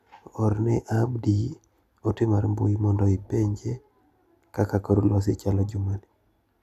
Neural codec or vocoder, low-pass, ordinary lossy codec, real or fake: none; 14.4 kHz; none; real